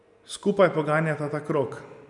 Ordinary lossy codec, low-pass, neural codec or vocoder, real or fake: none; 10.8 kHz; none; real